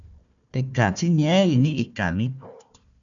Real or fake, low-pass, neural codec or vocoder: fake; 7.2 kHz; codec, 16 kHz, 1 kbps, FunCodec, trained on Chinese and English, 50 frames a second